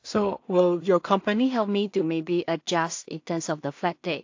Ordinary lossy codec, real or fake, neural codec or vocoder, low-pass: AAC, 48 kbps; fake; codec, 16 kHz in and 24 kHz out, 0.4 kbps, LongCat-Audio-Codec, two codebook decoder; 7.2 kHz